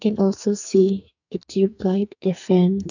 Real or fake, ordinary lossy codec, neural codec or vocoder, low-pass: fake; none; codec, 44.1 kHz, 2.6 kbps, SNAC; 7.2 kHz